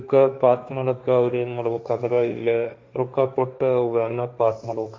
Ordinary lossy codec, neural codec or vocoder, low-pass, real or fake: none; codec, 16 kHz, 1.1 kbps, Voila-Tokenizer; none; fake